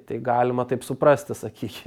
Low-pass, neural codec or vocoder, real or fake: 19.8 kHz; none; real